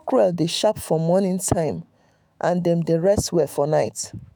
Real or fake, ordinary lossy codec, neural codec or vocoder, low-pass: fake; none; autoencoder, 48 kHz, 128 numbers a frame, DAC-VAE, trained on Japanese speech; none